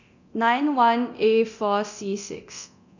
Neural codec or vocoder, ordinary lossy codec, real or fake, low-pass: codec, 24 kHz, 0.9 kbps, DualCodec; none; fake; 7.2 kHz